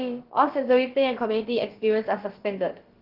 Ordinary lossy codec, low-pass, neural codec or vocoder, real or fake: Opus, 16 kbps; 5.4 kHz; codec, 16 kHz, about 1 kbps, DyCAST, with the encoder's durations; fake